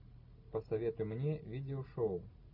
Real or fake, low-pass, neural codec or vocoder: real; 5.4 kHz; none